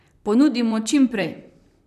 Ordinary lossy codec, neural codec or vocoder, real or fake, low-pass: none; vocoder, 44.1 kHz, 128 mel bands, Pupu-Vocoder; fake; 14.4 kHz